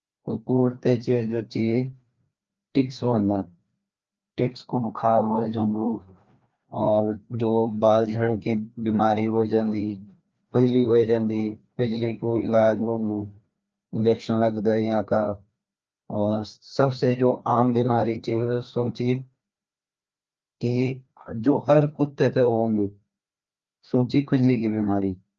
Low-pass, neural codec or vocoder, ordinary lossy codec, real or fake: 7.2 kHz; codec, 16 kHz, 2 kbps, FreqCodec, larger model; Opus, 24 kbps; fake